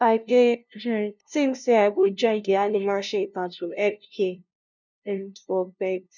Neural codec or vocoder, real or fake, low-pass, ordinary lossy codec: codec, 16 kHz, 0.5 kbps, FunCodec, trained on LibriTTS, 25 frames a second; fake; 7.2 kHz; none